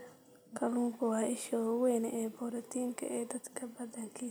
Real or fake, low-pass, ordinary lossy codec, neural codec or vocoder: real; none; none; none